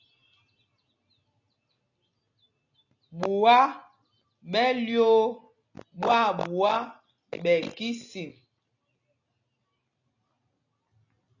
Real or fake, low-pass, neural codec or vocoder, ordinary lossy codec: real; 7.2 kHz; none; AAC, 48 kbps